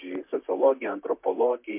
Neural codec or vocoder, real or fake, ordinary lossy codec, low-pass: vocoder, 44.1 kHz, 128 mel bands, Pupu-Vocoder; fake; MP3, 32 kbps; 3.6 kHz